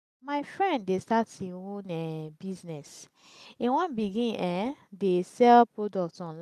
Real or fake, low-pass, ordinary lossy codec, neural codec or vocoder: real; 14.4 kHz; none; none